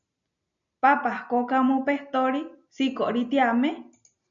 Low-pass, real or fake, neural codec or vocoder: 7.2 kHz; real; none